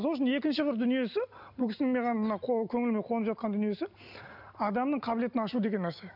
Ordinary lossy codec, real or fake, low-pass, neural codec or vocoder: none; real; 5.4 kHz; none